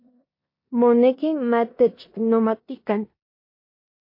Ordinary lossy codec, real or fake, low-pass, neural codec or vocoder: MP3, 32 kbps; fake; 5.4 kHz; codec, 16 kHz in and 24 kHz out, 0.9 kbps, LongCat-Audio-Codec, four codebook decoder